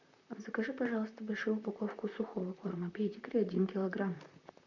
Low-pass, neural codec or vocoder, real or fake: 7.2 kHz; vocoder, 44.1 kHz, 128 mel bands, Pupu-Vocoder; fake